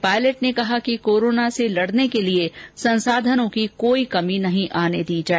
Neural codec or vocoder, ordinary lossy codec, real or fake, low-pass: none; none; real; none